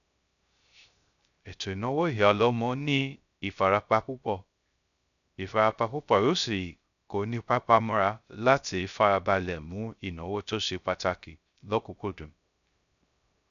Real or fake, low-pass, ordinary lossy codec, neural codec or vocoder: fake; 7.2 kHz; none; codec, 16 kHz, 0.3 kbps, FocalCodec